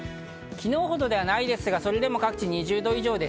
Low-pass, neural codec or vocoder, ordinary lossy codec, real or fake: none; none; none; real